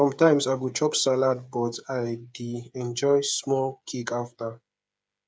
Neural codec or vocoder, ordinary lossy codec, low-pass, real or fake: codec, 16 kHz, 8 kbps, FreqCodec, smaller model; none; none; fake